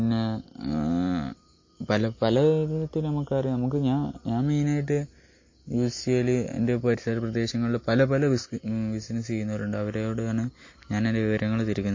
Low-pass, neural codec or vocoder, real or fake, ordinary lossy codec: 7.2 kHz; none; real; MP3, 32 kbps